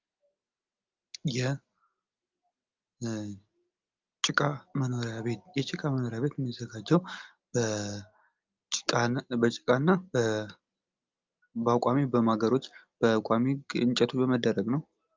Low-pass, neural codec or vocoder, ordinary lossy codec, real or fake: 7.2 kHz; none; Opus, 24 kbps; real